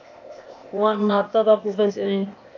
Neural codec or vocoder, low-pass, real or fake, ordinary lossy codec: codec, 16 kHz, 0.8 kbps, ZipCodec; 7.2 kHz; fake; AAC, 48 kbps